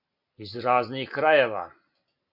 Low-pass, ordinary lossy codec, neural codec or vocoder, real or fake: 5.4 kHz; MP3, 48 kbps; none; real